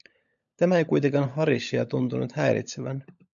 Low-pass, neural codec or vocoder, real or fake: 7.2 kHz; codec, 16 kHz, 16 kbps, FunCodec, trained on LibriTTS, 50 frames a second; fake